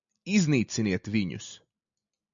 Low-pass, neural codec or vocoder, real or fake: 7.2 kHz; none; real